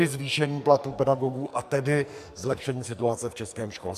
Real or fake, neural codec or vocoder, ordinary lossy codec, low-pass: fake; codec, 44.1 kHz, 2.6 kbps, SNAC; AAC, 96 kbps; 14.4 kHz